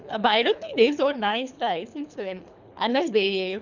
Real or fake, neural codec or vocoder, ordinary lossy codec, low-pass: fake; codec, 24 kHz, 3 kbps, HILCodec; none; 7.2 kHz